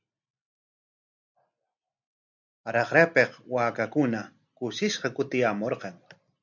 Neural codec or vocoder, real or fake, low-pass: none; real; 7.2 kHz